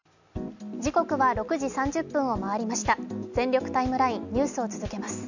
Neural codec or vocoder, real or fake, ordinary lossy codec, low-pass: none; real; none; 7.2 kHz